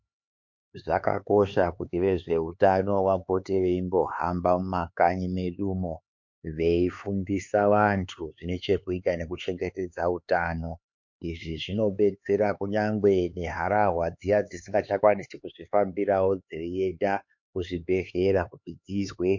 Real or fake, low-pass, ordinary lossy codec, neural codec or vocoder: fake; 7.2 kHz; MP3, 48 kbps; codec, 16 kHz, 4 kbps, X-Codec, HuBERT features, trained on LibriSpeech